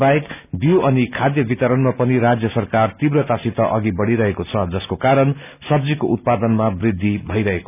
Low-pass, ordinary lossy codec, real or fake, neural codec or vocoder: 3.6 kHz; none; real; none